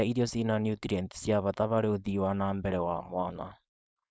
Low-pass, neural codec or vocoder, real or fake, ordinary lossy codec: none; codec, 16 kHz, 4.8 kbps, FACodec; fake; none